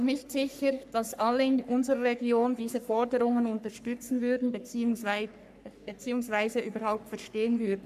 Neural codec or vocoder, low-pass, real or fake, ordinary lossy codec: codec, 44.1 kHz, 3.4 kbps, Pupu-Codec; 14.4 kHz; fake; AAC, 96 kbps